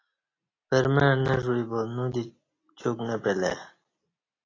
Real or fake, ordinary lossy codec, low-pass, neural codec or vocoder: real; AAC, 32 kbps; 7.2 kHz; none